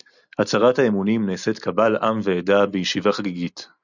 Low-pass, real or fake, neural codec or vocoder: 7.2 kHz; real; none